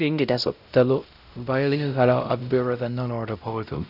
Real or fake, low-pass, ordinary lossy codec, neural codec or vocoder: fake; 5.4 kHz; none; codec, 16 kHz, 0.5 kbps, X-Codec, WavLM features, trained on Multilingual LibriSpeech